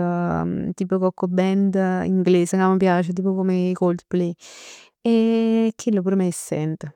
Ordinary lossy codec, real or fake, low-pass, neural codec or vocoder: none; real; 19.8 kHz; none